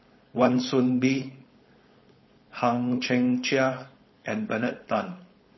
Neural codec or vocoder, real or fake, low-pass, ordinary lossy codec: codec, 16 kHz, 4.8 kbps, FACodec; fake; 7.2 kHz; MP3, 24 kbps